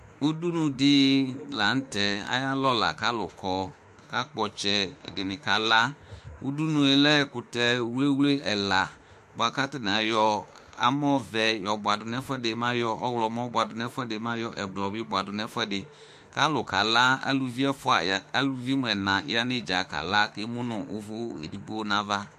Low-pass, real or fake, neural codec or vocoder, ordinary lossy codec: 14.4 kHz; fake; autoencoder, 48 kHz, 32 numbers a frame, DAC-VAE, trained on Japanese speech; MP3, 64 kbps